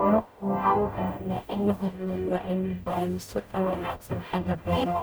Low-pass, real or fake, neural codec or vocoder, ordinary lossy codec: none; fake; codec, 44.1 kHz, 0.9 kbps, DAC; none